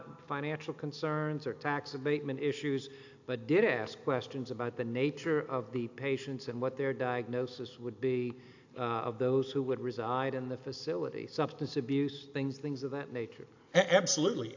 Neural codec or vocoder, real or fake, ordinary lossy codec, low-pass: none; real; AAC, 48 kbps; 7.2 kHz